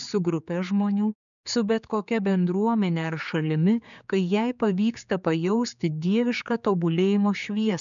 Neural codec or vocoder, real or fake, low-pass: codec, 16 kHz, 4 kbps, X-Codec, HuBERT features, trained on general audio; fake; 7.2 kHz